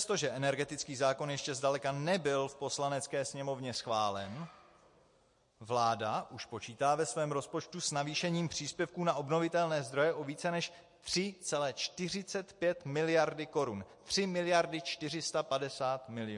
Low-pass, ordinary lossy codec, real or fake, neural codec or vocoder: 10.8 kHz; MP3, 48 kbps; real; none